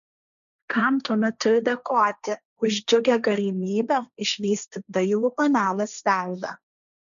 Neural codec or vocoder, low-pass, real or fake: codec, 16 kHz, 1.1 kbps, Voila-Tokenizer; 7.2 kHz; fake